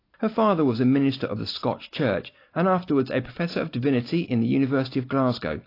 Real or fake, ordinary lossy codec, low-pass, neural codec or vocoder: real; AAC, 32 kbps; 5.4 kHz; none